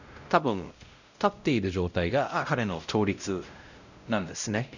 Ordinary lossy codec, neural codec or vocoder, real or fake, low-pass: none; codec, 16 kHz, 0.5 kbps, X-Codec, WavLM features, trained on Multilingual LibriSpeech; fake; 7.2 kHz